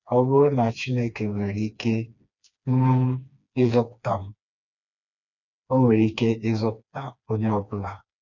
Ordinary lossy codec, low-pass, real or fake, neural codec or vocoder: none; 7.2 kHz; fake; codec, 16 kHz, 2 kbps, FreqCodec, smaller model